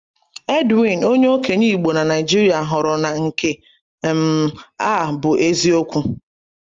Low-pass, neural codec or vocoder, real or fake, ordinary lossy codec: 7.2 kHz; none; real; Opus, 32 kbps